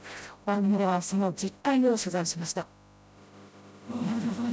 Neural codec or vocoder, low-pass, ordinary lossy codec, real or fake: codec, 16 kHz, 0.5 kbps, FreqCodec, smaller model; none; none; fake